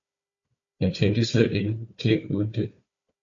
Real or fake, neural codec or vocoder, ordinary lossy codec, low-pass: fake; codec, 16 kHz, 4 kbps, FunCodec, trained on Chinese and English, 50 frames a second; AAC, 48 kbps; 7.2 kHz